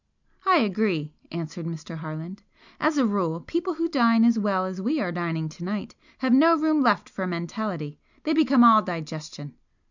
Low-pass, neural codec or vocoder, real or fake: 7.2 kHz; none; real